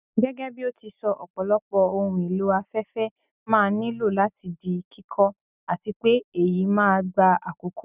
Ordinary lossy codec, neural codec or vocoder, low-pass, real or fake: none; none; 3.6 kHz; real